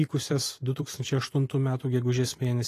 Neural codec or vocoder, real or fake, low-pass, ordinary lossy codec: vocoder, 44.1 kHz, 128 mel bands every 256 samples, BigVGAN v2; fake; 14.4 kHz; AAC, 48 kbps